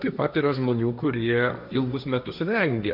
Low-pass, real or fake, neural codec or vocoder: 5.4 kHz; fake; codec, 16 kHz, 1.1 kbps, Voila-Tokenizer